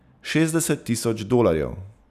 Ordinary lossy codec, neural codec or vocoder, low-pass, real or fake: none; none; 14.4 kHz; real